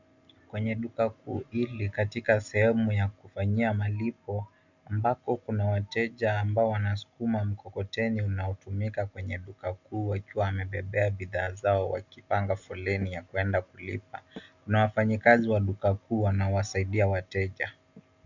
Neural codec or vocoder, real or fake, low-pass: none; real; 7.2 kHz